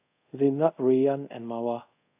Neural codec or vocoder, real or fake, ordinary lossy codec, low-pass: codec, 24 kHz, 0.5 kbps, DualCodec; fake; AAC, 32 kbps; 3.6 kHz